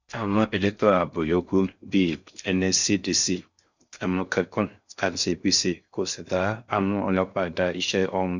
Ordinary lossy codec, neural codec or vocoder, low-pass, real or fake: Opus, 64 kbps; codec, 16 kHz in and 24 kHz out, 0.6 kbps, FocalCodec, streaming, 4096 codes; 7.2 kHz; fake